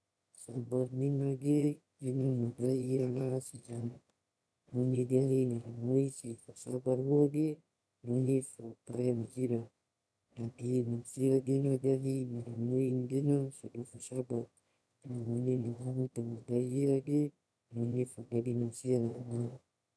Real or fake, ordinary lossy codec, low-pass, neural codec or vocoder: fake; none; none; autoencoder, 22.05 kHz, a latent of 192 numbers a frame, VITS, trained on one speaker